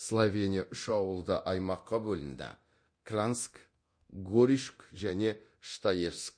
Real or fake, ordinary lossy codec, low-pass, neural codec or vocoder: fake; MP3, 48 kbps; 9.9 kHz; codec, 24 kHz, 0.9 kbps, DualCodec